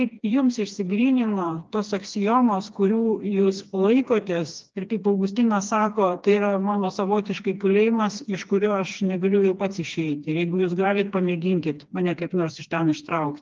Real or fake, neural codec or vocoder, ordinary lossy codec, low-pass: fake; codec, 16 kHz, 2 kbps, FreqCodec, smaller model; Opus, 24 kbps; 7.2 kHz